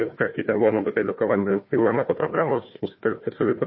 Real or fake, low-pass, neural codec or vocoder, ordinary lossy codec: fake; 7.2 kHz; codec, 16 kHz, 1 kbps, FunCodec, trained on Chinese and English, 50 frames a second; MP3, 24 kbps